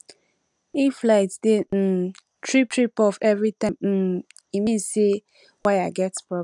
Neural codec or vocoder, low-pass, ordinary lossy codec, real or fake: none; 10.8 kHz; none; real